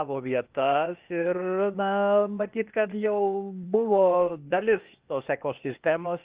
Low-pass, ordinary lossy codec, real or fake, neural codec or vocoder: 3.6 kHz; Opus, 24 kbps; fake; codec, 16 kHz, 0.8 kbps, ZipCodec